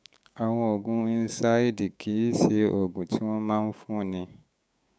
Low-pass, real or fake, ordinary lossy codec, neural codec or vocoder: none; fake; none; codec, 16 kHz, 6 kbps, DAC